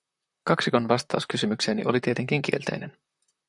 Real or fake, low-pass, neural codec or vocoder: fake; 10.8 kHz; vocoder, 44.1 kHz, 128 mel bands, Pupu-Vocoder